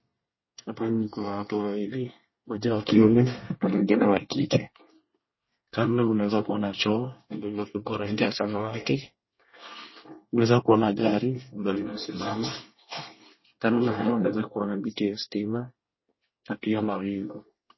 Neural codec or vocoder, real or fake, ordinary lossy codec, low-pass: codec, 24 kHz, 1 kbps, SNAC; fake; MP3, 24 kbps; 7.2 kHz